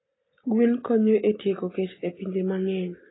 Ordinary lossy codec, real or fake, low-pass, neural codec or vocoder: AAC, 16 kbps; real; 7.2 kHz; none